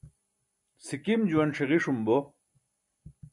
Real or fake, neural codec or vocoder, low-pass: real; none; 10.8 kHz